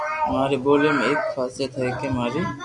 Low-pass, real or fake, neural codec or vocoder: 10.8 kHz; real; none